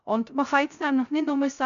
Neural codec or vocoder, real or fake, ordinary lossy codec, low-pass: codec, 16 kHz, 0.3 kbps, FocalCodec; fake; none; 7.2 kHz